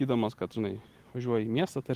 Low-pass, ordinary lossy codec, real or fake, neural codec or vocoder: 14.4 kHz; Opus, 24 kbps; real; none